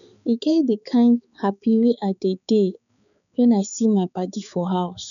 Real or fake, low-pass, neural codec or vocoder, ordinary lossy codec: fake; 7.2 kHz; codec, 16 kHz, 4 kbps, X-Codec, HuBERT features, trained on balanced general audio; none